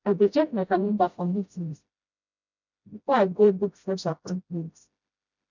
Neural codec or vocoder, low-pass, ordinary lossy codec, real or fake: codec, 16 kHz, 0.5 kbps, FreqCodec, smaller model; 7.2 kHz; none; fake